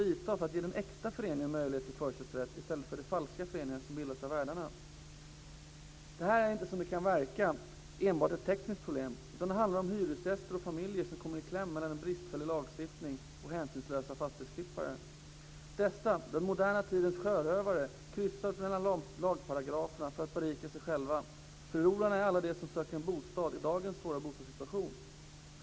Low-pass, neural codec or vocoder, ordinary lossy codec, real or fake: none; none; none; real